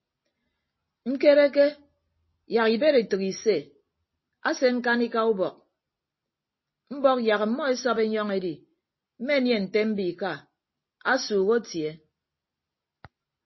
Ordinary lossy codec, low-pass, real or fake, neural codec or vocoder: MP3, 24 kbps; 7.2 kHz; real; none